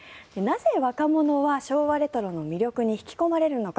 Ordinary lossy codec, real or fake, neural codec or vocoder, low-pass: none; real; none; none